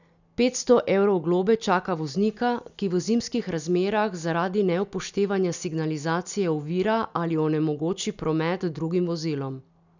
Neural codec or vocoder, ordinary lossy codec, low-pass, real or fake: none; none; 7.2 kHz; real